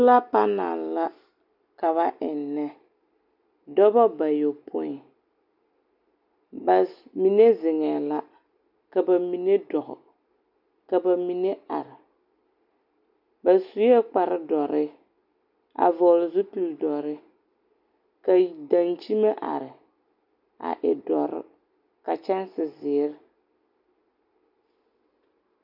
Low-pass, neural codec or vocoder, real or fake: 5.4 kHz; none; real